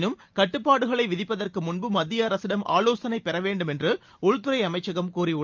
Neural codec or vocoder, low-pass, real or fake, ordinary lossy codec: none; 7.2 kHz; real; Opus, 24 kbps